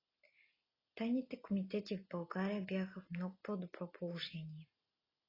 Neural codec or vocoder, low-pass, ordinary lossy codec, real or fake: none; 5.4 kHz; AAC, 24 kbps; real